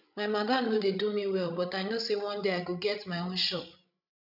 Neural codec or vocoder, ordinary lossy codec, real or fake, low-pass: codec, 16 kHz, 16 kbps, FreqCodec, larger model; none; fake; 5.4 kHz